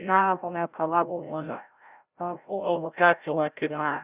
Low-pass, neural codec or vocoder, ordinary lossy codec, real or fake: 3.6 kHz; codec, 16 kHz, 0.5 kbps, FreqCodec, larger model; Opus, 64 kbps; fake